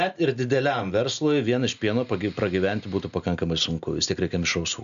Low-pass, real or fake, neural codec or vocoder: 7.2 kHz; real; none